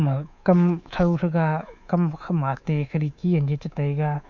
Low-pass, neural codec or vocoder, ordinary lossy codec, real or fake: 7.2 kHz; codec, 24 kHz, 3.1 kbps, DualCodec; none; fake